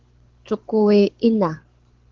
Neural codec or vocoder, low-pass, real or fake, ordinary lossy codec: codec, 24 kHz, 6 kbps, HILCodec; 7.2 kHz; fake; Opus, 16 kbps